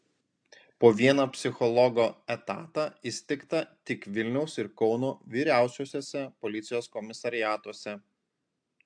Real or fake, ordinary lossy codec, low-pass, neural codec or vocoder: real; AAC, 64 kbps; 9.9 kHz; none